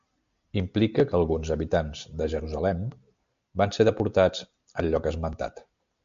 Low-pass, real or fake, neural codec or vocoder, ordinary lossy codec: 7.2 kHz; real; none; MP3, 64 kbps